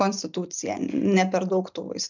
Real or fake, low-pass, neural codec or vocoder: real; 7.2 kHz; none